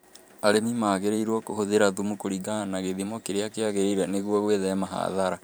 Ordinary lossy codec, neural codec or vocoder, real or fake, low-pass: none; vocoder, 44.1 kHz, 128 mel bands every 512 samples, BigVGAN v2; fake; none